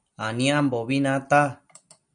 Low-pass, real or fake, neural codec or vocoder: 9.9 kHz; real; none